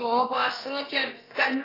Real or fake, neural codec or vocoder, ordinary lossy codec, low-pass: fake; codec, 16 kHz, about 1 kbps, DyCAST, with the encoder's durations; AAC, 24 kbps; 5.4 kHz